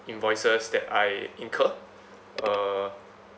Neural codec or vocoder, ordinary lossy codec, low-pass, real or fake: none; none; none; real